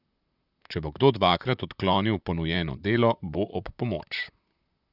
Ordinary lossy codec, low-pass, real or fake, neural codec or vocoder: none; 5.4 kHz; fake; vocoder, 44.1 kHz, 128 mel bands, Pupu-Vocoder